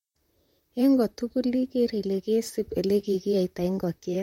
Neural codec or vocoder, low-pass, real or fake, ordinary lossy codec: vocoder, 44.1 kHz, 128 mel bands, Pupu-Vocoder; 19.8 kHz; fake; MP3, 64 kbps